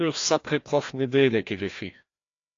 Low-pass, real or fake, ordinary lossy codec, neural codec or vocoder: 7.2 kHz; fake; AAC, 48 kbps; codec, 16 kHz, 1 kbps, FreqCodec, larger model